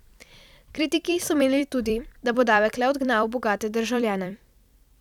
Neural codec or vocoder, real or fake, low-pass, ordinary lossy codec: vocoder, 44.1 kHz, 128 mel bands every 256 samples, BigVGAN v2; fake; 19.8 kHz; none